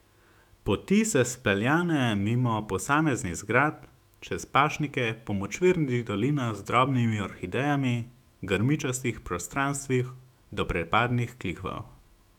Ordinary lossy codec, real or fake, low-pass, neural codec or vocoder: none; fake; 19.8 kHz; autoencoder, 48 kHz, 128 numbers a frame, DAC-VAE, trained on Japanese speech